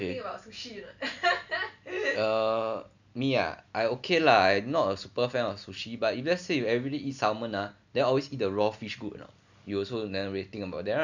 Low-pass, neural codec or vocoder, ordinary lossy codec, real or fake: 7.2 kHz; none; none; real